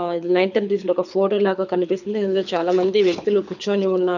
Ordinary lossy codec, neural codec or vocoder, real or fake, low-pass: none; codec, 24 kHz, 6 kbps, HILCodec; fake; 7.2 kHz